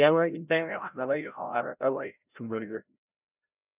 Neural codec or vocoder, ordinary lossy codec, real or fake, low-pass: codec, 16 kHz, 0.5 kbps, FreqCodec, larger model; none; fake; 3.6 kHz